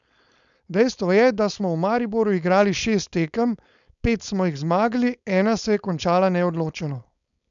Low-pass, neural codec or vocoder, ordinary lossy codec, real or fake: 7.2 kHz; codec, 16 kHz, 4.8 kbps, FACodec; none; fake